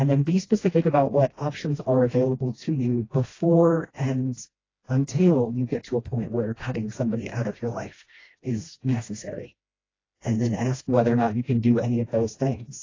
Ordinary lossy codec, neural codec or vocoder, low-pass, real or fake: AAC, 32 kbps; codec, 16 kHz, 1 kbps, FreqCodec, smaller model; 7.2 kHz; fake